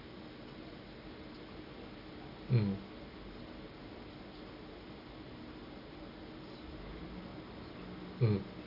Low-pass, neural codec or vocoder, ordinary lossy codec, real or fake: 5.4 kHz; none; none; real